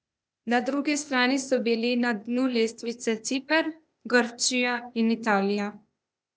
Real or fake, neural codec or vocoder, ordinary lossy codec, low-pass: fake; codec, 16 kHz, 0.8 kbps, ZipCodec; none; none